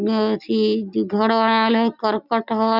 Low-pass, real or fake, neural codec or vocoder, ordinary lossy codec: 5.4 kHz; real; none; none